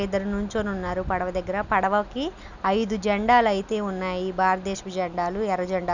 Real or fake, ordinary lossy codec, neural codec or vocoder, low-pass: real; none; none; 7.2 kHz